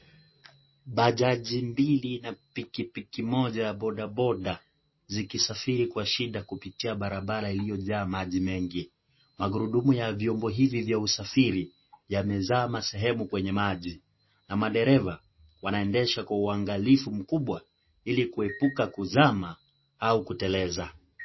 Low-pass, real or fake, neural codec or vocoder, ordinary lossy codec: 7.2 kHz; real; none; MP3, 24 kbps